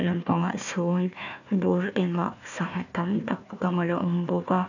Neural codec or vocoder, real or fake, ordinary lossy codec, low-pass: codec, 16 kHz, 1 kbps, FunCodec, trained on Chinese and English, 50 frames a second; fake; none; 7.2 kHz